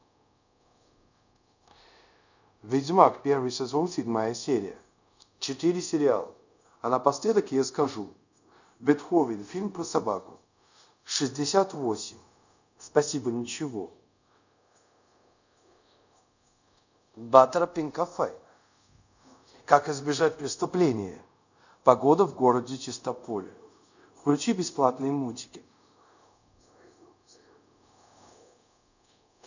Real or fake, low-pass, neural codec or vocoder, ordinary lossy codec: fake; 7.2 kHz; codec, 24 kHz, 0.5 kbps, DualCodec; none